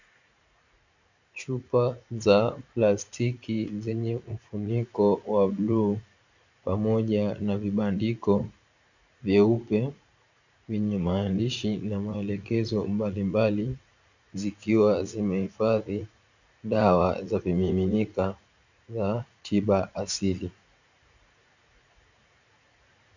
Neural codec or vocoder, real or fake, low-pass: vocoder, 44.1 kHz, 80 mel bands, Vocos; fake; 7.2 kHz